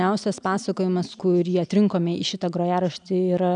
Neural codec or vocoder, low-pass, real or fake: none; 9.9 kHz; real